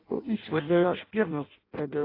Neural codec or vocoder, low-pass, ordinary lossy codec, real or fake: codec, 16 kHz in and 24 kHz out, 0.6 kbps, FireRedTTS-2 codec; 5.4 kHz; AAC, 24 kbps; fake